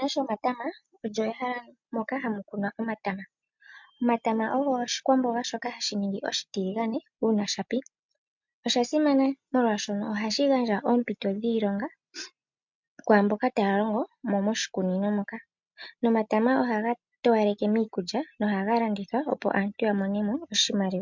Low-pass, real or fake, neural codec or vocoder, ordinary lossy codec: 7.2 kHz; real; none; MP3, 64 kbps